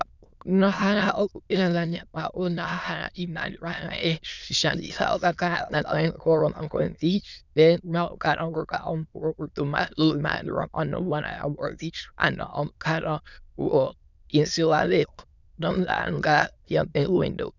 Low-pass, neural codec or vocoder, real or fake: 7.2 kHz; autoencoder, 22.05 kHz, a latent of 192 numbers a frame, VITS, trained on many speakers; fake